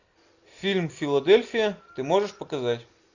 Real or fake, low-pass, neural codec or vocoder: real; 7.2 kHz; none